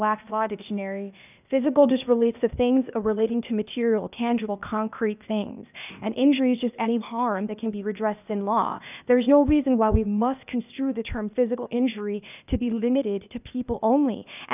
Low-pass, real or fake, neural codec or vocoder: 3.6 kHz; fake; codec, 16 kHz, 0.8 kbps, ZipCodec